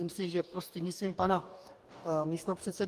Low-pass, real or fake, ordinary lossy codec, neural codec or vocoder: 14.4 kHz; fake; Opus, 32 kbps; codec, 44.1 kHz, 2.6 kbps, DAC